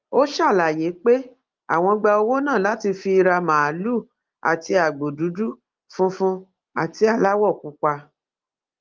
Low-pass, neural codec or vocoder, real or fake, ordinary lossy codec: 7.2 kHz; none; real; Opus, 32 kbps